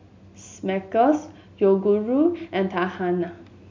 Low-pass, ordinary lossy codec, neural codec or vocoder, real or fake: 7.2 kHz; MP3, 64 kbps; none; real